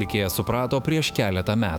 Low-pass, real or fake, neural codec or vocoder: 19.8 kHz; fake; autoencoder, 48 kHz, 128 numbers a frame, DAC-VAE, trained on Japanese speech